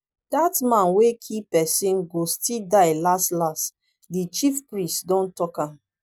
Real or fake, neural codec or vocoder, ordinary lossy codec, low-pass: real; none; none; none